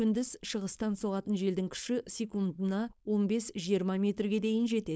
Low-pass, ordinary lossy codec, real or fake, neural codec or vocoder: none; none; fake; codec, 16 kHz, 4.8 kbps, FACodec